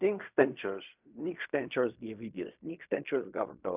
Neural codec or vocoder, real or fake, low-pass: codec, 16 kHz in and 24 kHz out, 0.4 kbps, LongCat-Audio-Codec, fine tuned four codebook decoder; fake; 3.6 kHz